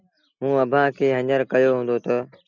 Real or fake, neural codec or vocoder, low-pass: real; none; 7.2 kHz